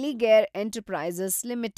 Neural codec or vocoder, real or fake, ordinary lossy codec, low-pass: none; real; none; 14.4 kHz